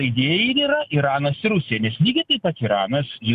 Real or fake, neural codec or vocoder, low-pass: real; none; 14.4 kHz